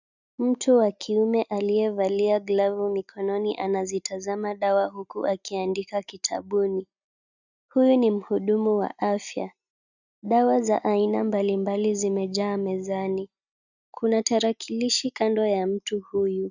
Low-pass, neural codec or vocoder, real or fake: 7.2 kHz; none; real